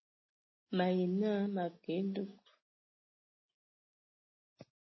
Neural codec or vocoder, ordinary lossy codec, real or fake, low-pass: none; MP3, 24 kbps; real; 7.2 kHz